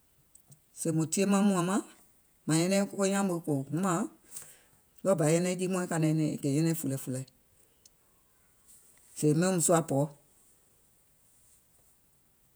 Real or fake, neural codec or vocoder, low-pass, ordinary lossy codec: fake; vocoder, 48 kHz, 128 mel bands, Vocos; none; none